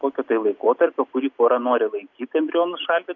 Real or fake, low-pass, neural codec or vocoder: real; 7.2 kHz; none